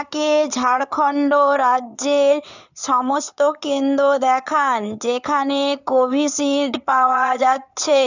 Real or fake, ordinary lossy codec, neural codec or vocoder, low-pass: fake; none; codec, 16 kHz in and 24 kHz out, 2.2 kbps, FireRedTTS-2 codec; 7.2 kHz